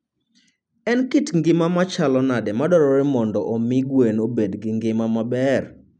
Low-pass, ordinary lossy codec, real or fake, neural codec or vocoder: 9.9 kHz; none; real; none